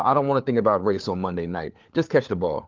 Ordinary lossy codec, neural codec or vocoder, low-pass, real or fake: Opus, 32 kbps; codec, 16 kHz, 4 kbps, FunCodec, trained on LibriTTS, 50 frames a second; 7.2 kHz; fake